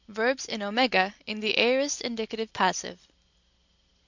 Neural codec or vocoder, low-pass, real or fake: none; 7.2 kHz; real